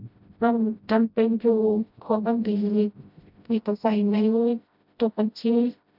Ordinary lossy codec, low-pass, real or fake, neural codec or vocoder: none; 5.4 kHz; fake; codec, 16 kHz, 0.5 kbps, FreqCodec, smaller model